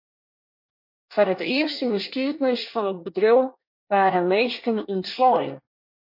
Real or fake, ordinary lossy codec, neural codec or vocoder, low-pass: fake; MP3, 32 kbps; codec, 24 kHz, 1 kbps, SNAC; 5.4 kHz